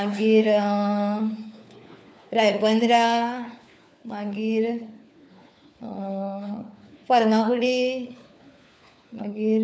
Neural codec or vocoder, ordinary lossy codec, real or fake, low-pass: codec, 16 kHz, 4 kbps, FunCodec, trained on LibriTTS, 50 frames a second; none; fake; none